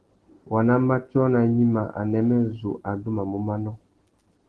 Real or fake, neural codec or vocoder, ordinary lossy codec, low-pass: real; none; Opus, 16 kbps; 10.8 kHz